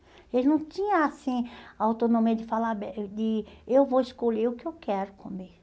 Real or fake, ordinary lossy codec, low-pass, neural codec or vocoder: real; none; none; none